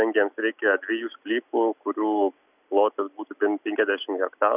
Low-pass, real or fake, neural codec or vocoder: 3.6 kHz; real; none